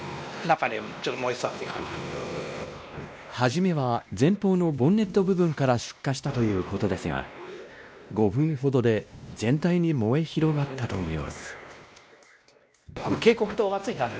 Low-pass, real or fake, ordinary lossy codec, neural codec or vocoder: none; fake; none; codec, 16 kHz, 1 kbps, X-Codec, WavLM features, trained on Multilingual LibriSpeech